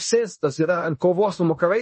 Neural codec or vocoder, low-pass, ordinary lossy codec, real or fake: codec, 16 kHz in and 24 kHz out, 0.9 kbps, LongCat-Audio-Codec, fine tuned four codebook decoder; 10.8 kHz; MP3, 32 kbps; fake